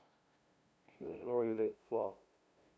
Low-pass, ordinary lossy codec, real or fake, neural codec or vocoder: none; none; fake; codec, 16 kHz, 0.5 kbps, FunCodec, trained on LibriTTS, 25 frames a second